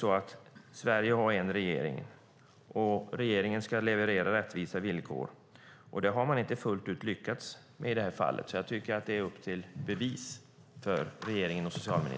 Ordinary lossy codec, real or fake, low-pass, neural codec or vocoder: none; real; none; none